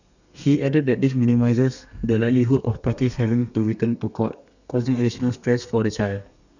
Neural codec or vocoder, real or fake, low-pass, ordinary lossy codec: codec, 32 kHz, 1.9 kbps, SNAC; fake; 7.2 kHz; none